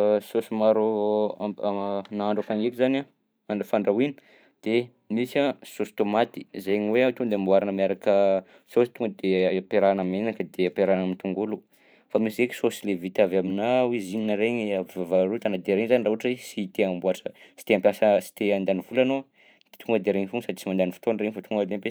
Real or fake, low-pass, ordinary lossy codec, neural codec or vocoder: fake; none; none; vocoder, 44.1 kHz, 128 mel bands every 512 samples, BigVGAN v2